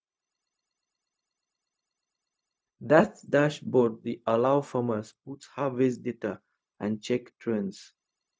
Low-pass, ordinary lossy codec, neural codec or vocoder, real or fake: none; none; codec, 16 kHz, 0.4 kbps, LongCat-Audio-Codec; fake